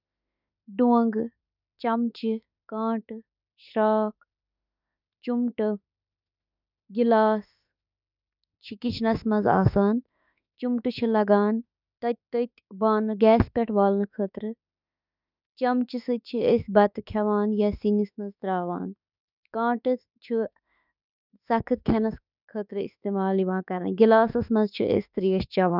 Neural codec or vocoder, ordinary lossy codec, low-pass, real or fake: autoencoder, 48 kHz, 32 numbers a frame, DAC-VAE, trained on Japanese speech; none; 5.4 kHz; fake